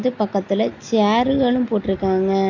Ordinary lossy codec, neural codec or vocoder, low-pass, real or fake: AAC, 48 kbps; none; 7.2 kHz; real